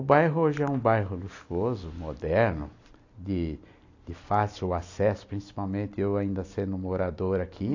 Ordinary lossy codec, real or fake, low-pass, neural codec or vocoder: AAC, 48 kbps; real; 7.2 kHz; none